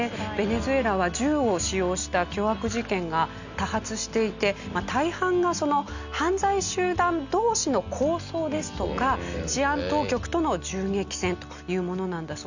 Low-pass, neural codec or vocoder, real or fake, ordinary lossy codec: 7.2 kHz; none; real; none